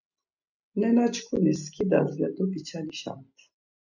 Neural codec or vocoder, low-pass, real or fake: none; 7.2 kHz; real